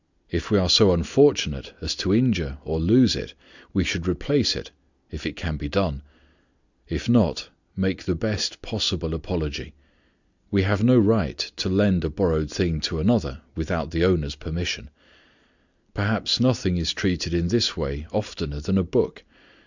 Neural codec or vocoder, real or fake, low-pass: none; real; 7.2 kHz